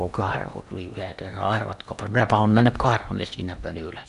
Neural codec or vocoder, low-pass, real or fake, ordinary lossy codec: codec, 16 kHz in and 24 kHz out, 0.8 kbps, FocalCodec, streaming, 65536 codes; 10.8 kHz; fake; none